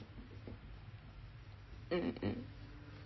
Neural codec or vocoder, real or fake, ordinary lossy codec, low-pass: none; real; MP3, 24 kbps; 7.2 kHz